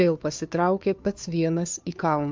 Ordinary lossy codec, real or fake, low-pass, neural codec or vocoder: MP3, 64 kbps; fake; 7.2 kHz; codec, 16 kHz, 2 kbps, FunCodec, trained on Chinese and English, 25 frames a second